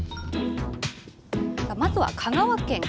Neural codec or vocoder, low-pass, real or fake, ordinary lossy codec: none; none; real; none